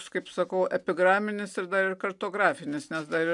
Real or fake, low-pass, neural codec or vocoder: real; 10.8 kHz; none